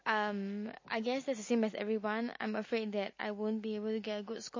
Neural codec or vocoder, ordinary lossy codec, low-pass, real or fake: none; MP3, 32 kbps; 7.2 kHz; real